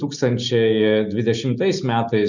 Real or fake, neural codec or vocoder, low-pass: real; none; 7.2 kHz